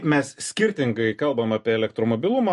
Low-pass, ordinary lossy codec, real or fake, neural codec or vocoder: 14.4 kHz; MP3, 48 kbps; real; none